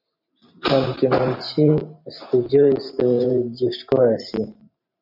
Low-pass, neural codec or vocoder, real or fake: 5.4 kHz; vocoder, 44.1 kHz, 128 mel bands every 512 samples, BigVGAN v2; fake